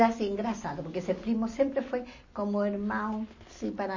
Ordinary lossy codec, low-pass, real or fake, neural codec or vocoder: MP3, 32 kbps; 7.2 kHz; real; none